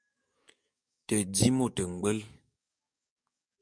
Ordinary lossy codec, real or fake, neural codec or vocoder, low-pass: MP3, 96 kbps; fake; codec, 44.1 kHz, 7.8 kbps, DAC; 9.9 kHz